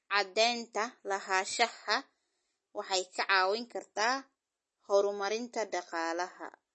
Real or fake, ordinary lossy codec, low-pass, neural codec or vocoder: real; MP3, 32 kbps; 10.8 kHz; none